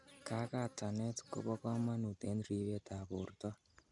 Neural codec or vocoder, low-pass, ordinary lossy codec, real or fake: none; 10.8 kHz; none; real